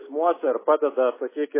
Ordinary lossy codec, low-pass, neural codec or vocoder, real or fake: MP3, 16 kbps; 3.6 kHz; none; real